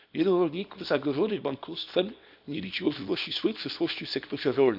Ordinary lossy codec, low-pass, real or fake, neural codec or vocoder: none; 5.4 kHz; fake; codec, 24 kHz, 0.9 kbps, WavTokenizer, small release